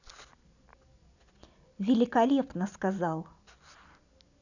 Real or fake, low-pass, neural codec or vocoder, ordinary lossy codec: real; 7.2 kHz; none; none